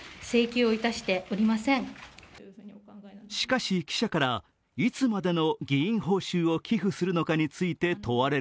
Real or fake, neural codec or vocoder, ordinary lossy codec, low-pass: real; none; none; none